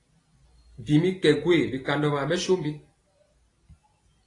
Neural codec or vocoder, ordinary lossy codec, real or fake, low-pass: none; AAC, 32 kbps; real; 10.8 kHz